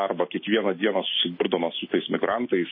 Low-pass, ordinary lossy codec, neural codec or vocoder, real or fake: 5.4 kHz; MP3, 24 kbps; none; real